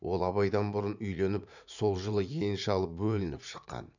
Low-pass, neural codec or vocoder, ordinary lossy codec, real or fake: 7.2 kHz; vocoder, 44.1 kHz, 80 mel bands, Vocos; none; fake